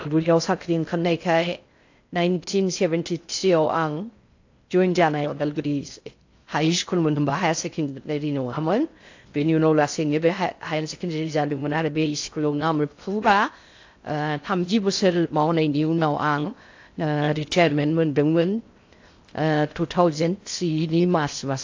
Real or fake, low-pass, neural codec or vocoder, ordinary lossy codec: fake; 7.2 kHz; codec, 16 kHz in and 24 kHz out, 0.6 kbps, FocalCodec, streaming, 2048 codes; AAC, 48 kbps